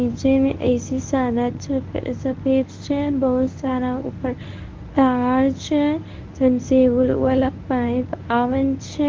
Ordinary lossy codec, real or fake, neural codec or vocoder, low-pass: Opus, 32 kbps; fake; codec, 24 kHz, 0.9 kbps, WavTokenizer, medium speech release version 1; 7.2 kHz